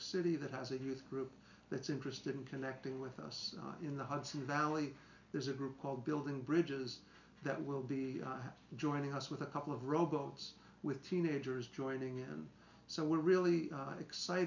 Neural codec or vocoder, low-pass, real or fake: none; 7.2 kHz; real